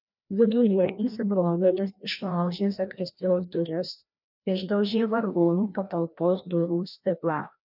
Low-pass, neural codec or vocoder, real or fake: 5.4 kHz; codec, 16 kHz, 1 kbps, FreqCodec, larger model; fake